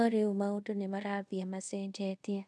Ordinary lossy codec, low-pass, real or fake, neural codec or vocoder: none; none; fake; codec, 24 kHz, 0.5 kbps, DualCodec